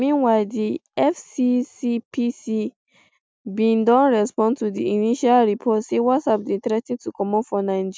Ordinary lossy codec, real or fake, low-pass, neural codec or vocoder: none; real; none; none